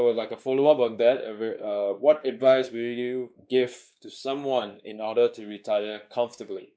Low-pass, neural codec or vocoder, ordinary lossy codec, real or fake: none; codec, 16 kHz, 2 kbps, X-Codec, WavLM features, trained on Multilingual LibriSpeech; none; fake